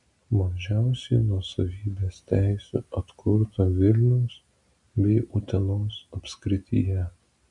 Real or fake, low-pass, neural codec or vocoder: real; 10.8 kHz; none